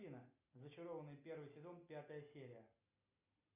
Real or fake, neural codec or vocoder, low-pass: real; none; 3.6 kHz